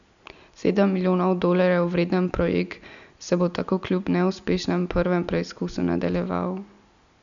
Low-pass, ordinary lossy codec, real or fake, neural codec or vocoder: 7.2 kHz; none; real; none